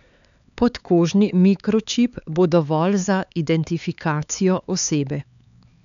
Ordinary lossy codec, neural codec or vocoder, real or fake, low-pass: none; codec, 16 kHz, 4 kbps, X-Codec, HuBERT features, trained on LibriSpeech; fake; 7.2 kHz